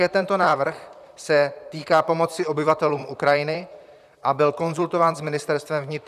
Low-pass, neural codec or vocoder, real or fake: 14.4 kHz; vocoder, 44.1 kHz, 128 mel bands, Pupu-Vocoder; fake